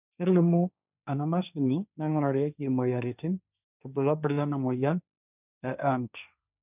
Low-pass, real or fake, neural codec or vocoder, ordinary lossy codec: 3.6 kHz; fake; codec, 16 kHz, 1.1 kbps, Voila-Tokenizer; none